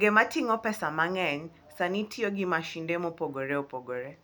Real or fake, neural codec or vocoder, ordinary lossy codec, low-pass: real; none; none; none